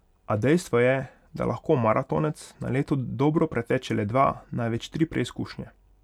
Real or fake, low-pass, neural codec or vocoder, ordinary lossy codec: real; 19.8 kHz; none; none